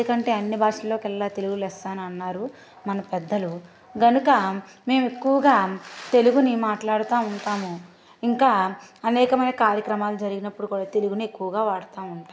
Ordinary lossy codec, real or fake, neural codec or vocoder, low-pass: none; real; none; none